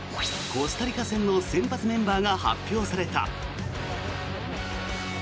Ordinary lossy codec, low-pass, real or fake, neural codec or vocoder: none; none; real; none